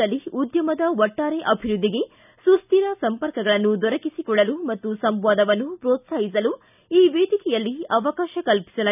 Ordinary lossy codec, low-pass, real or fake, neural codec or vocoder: none; 3.6 kHz; real; none